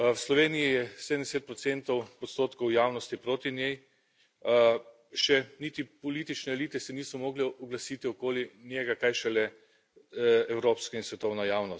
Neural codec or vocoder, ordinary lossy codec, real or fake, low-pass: none; none; real; none